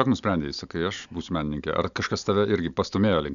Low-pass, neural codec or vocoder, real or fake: 7.2 kHz; none; real